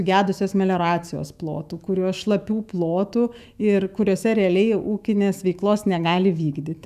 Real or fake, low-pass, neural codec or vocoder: fake; 14.4 kHz; autoencoder, 48 kHz, 128 numbers a frame, DAC-VAE, trained on Japanese speech